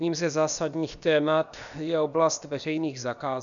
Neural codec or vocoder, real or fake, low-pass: codec, 16 kHz, about 1 kbps, DyCAST, with the encoder's durations; fake; 7.2 kHz